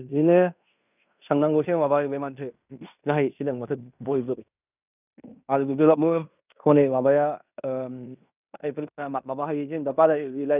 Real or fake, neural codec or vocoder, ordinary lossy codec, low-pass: fake; codec, 16 kHz in and 24 kHz out, 0.9 kbps, LongCat-Audio-Codec, fine tuned four codebook decoder; none; 3.6 kHz